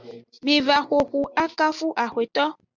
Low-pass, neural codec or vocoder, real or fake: 7.2 kHz; none; real